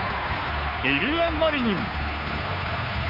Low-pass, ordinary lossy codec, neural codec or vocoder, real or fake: 5.4 kHz; none; codec, 16 kHz, 2 kbps, FunCodec, trained on Chinese and English, 25 frames a second; fake